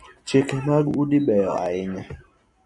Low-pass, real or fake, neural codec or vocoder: 10.8 kHz; real; none